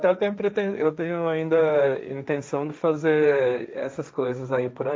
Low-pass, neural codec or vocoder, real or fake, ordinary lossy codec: none; codec, 16 kHz, 1.1 kbps, Voila-Tokenizer; fake; none